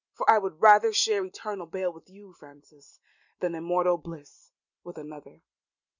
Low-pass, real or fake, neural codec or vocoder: 7.2 kHz; real; none